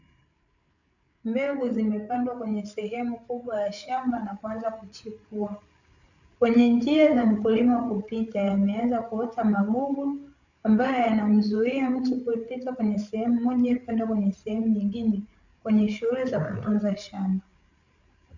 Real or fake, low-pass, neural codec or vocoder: fake; 7.2 kHz; codec, 16 kHz, 8 kbps, FreqCodec, larger model